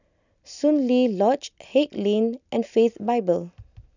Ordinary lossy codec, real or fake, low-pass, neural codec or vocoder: none; real; 7.2 kHz; none